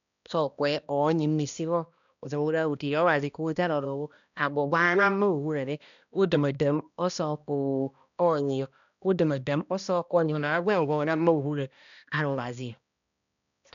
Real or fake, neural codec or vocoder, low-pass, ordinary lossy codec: fake; codec, 16 kHz, 1 kbps, X-Codec, HuBERT features, trained on balanced general audio; 7.2 kHz; none